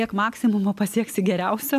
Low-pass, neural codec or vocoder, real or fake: 14.4 kHz; none; real